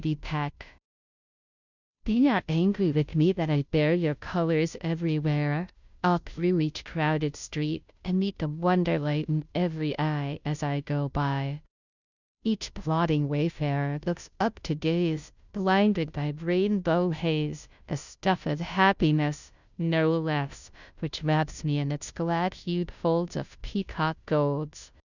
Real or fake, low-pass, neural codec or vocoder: fake; 7.2 kHz; codec, 16 kHz, 0.5 kbps, FunCodec, trained on Chinese and English, 25 frames a second